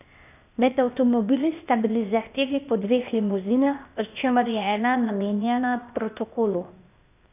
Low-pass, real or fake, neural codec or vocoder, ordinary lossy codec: 3.6 kHz; fake; codec, 16 kHz, 0.8 kbps, ZipCodec; none